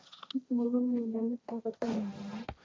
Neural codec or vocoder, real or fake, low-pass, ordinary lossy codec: codec, 16 kHz, 1.1 kbps, Voila-Tokenizer; fake; none; none